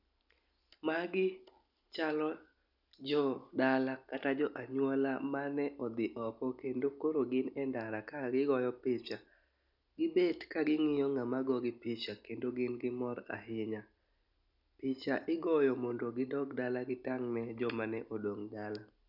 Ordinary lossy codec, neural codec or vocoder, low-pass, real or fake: none; none; 5.4 kHz; real